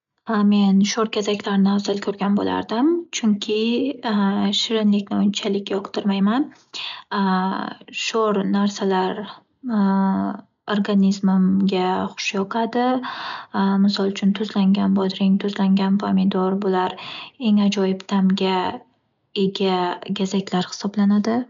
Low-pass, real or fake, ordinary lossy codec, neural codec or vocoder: 7.2 kHz; real; MP3, 64 kbps; none